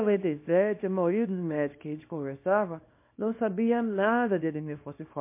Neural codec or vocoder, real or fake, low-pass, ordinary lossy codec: codec, 24 kHz, 0.9 kbps, WavTokenizer, small release; fake; 3.6 kHz; MP3, 24 kbps